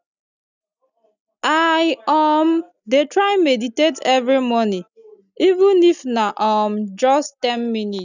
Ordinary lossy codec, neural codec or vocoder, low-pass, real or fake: none; none; 7.2 kHz; real